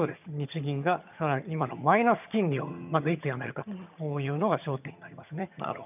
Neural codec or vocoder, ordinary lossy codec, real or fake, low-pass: vocoder, 22.05 kHz, 80 mel bands, HiFi-GAN; none; fake; 3.6 kHz